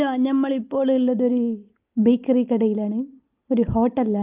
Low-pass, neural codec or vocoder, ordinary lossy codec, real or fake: 3.6 kHz; none; Opus, 32 kbps; real